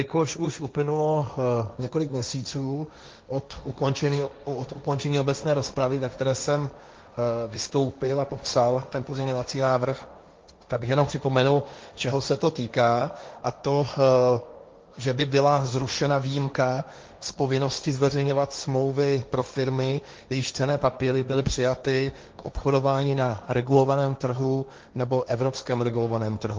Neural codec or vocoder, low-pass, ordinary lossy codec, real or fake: codec, 16 kHz, 1.1 kbps, Voila-Tokenizer; 7.2 kHz; Opus, 24 kbps; fake